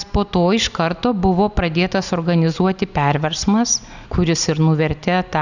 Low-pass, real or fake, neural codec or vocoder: 7.2 kHz; real; none